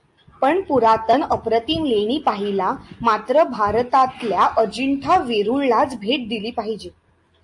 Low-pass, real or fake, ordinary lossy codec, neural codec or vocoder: 10.8 kHz; real; AAC, 64 kbps; none